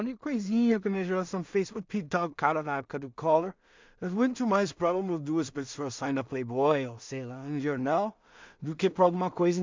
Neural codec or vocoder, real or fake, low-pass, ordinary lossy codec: codec, 16 kHz in and 24 kHz out, 0.4 kbps, LongCat-Audio-Codec, two codebook decoder; fake; 7.2 kHz; MP3, 64 kbps